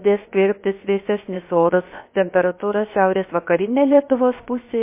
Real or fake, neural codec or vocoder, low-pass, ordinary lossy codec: fake; codec, 16 kHz, about 1 kbps, DyCAST, with the encoder's durations; 3.6 kHz; MP3, 24 kbps